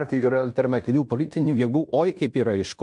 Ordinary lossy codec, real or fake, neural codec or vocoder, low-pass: MP3, 64 kbps; fake; codec, 16 kHz in and 24 kHz out, 0.9 kbps, LongCat-Audio-Codec, fine tuned four codebook decoder; 10.8 kHz